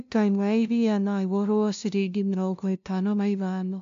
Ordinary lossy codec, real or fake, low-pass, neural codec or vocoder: MP3, 64 kbps; fake; 7.2 kHz; codec, 16 kHz, 0.5 kbps, FunCodec, trained on LibriTTS, 25 frames a second